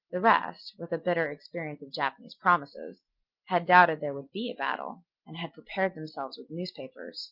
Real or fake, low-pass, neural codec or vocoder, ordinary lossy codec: real; 5.4 kHz; none; Opus, 24 kbps